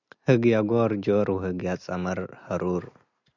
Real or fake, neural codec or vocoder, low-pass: real; none; 7.2 kHz